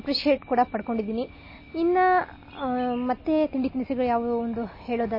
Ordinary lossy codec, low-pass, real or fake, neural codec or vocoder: MP3, 24 kbps; 5.4 kHz; real; none